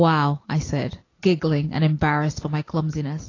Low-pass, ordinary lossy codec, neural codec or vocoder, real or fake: 7.2 kHz; AAC, 32 kbps; none; real